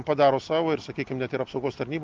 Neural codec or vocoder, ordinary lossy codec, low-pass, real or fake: none; Opus, 24 kbps; 7.2 kHz; real